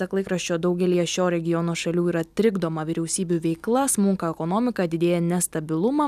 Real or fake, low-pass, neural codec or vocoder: real; 14.4 kHz; none